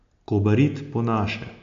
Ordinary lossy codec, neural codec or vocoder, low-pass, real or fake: AAC, 64 kbps; none; 7.2 kHz; real